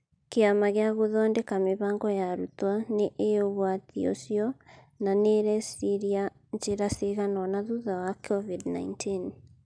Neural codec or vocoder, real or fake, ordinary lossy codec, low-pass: none; real; none; 9.9 kHz